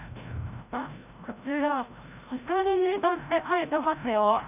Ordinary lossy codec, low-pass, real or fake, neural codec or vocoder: none; 3.6 kHz; fake; codec, 16 kHz, 0.5 kbps, FreqCodec, larger model